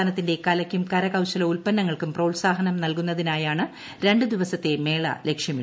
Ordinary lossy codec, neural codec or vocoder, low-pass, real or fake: none; none; none; real